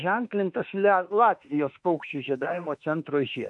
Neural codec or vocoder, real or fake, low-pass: autoencoder, 48 kHz, 32 numbers a frame, DAC-VAE, trained on Japanese speech; fake; 10.8 kHz